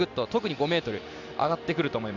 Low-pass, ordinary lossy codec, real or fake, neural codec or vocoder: 7.2 kHz; Opus, 64 kbps; real; none